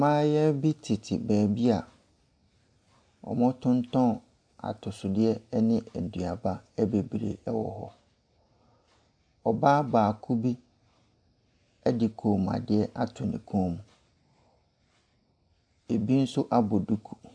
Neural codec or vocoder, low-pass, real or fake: none; 9.9 kHz; real